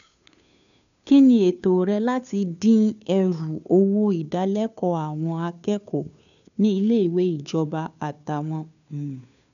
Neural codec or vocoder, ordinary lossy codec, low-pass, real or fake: codec, 16 kHz, 2 kbps, FunCodec, trained on Chinese and English, 25 frames a second; none; 7.2 kHz; fake